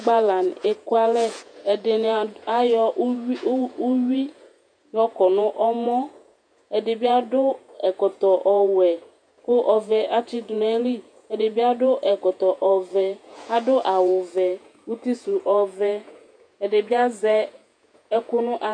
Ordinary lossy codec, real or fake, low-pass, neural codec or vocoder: MP3, 96 kbps; fake; 9.9 kHz; vocoder, 48 kHz, 128 mel bands, Vocos